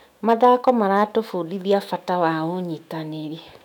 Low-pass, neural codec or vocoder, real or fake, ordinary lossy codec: 19.8 kHz; autoencoder, 48 kHz, 128 numbers a frame, DAC-VAE, trained on Japanese speech; fake; none